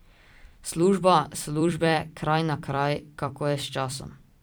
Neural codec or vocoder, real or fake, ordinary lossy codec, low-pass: vocoder, 44.1 kHz, 128 mel bands every 256 samples, BigVGAN v2; fake; none; none